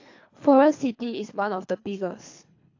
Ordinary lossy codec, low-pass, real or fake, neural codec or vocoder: AAC, 48 kbps; 7.2 kHz; fake; codec, 24 kHz, 3 kbps, HILCodec